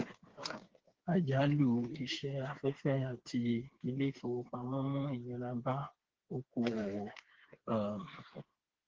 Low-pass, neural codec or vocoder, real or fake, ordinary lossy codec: 7.2 kHz; codec, 16 kHz, 4 kbps, FreqCodec, smaller model; fake; Opus, 16 kbps